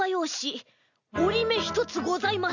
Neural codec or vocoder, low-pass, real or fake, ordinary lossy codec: none; 7.2 kHz; real; none